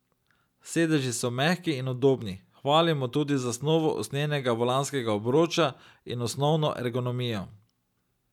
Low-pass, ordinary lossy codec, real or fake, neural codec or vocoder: 19.8 kHz; none; real; none